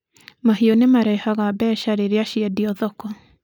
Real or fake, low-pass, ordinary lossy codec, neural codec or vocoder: real; 19.8 kHz; none; none